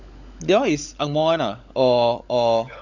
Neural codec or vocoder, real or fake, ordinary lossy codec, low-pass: codec, 16 kHz, 16 kbps, FunCodec, trained on LibriTTS, 50 frames a second; fake; none; 7.2 kHz